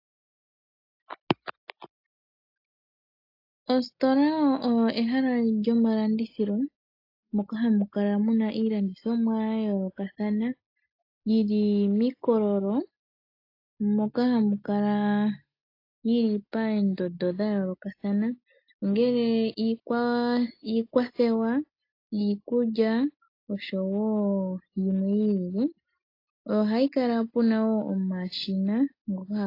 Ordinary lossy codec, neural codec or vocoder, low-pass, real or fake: AAC, 32 kbps; none; 5.4 kHz; real